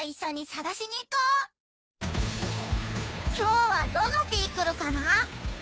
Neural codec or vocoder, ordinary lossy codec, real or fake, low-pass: codec, 16 kHz, 2 kbps, FunCodec, trained on Chinese and English, 25 frames a second; none; fake; none